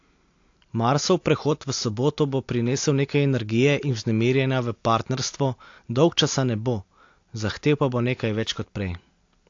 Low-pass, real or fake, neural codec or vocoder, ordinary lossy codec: 7.2 kHz; real; none; AAC, 48 kbps